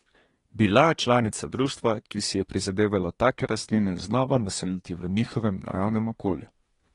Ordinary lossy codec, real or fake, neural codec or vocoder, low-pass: AAC, 32 kbps; fake; codec, 24 kHz, 1 kbps, SNAC; 10.8 kHz